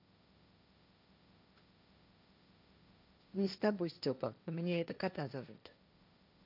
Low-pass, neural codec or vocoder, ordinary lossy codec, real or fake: 5.4 kHz; codec, 16 kHz, 1.1 kbps, Voila-Tokenizer; none; fake